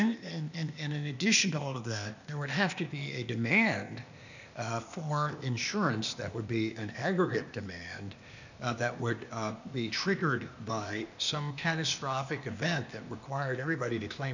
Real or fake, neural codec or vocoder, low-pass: fake; codec, 16 kHz, 0.8 kbps, ZipCodec; 7.2 kHz